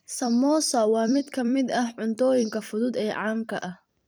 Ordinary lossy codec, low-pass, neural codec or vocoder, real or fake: none; none; vocoder, 44.1 kHz, 128 mel bands every 256 samples, BigVGAN v2; fake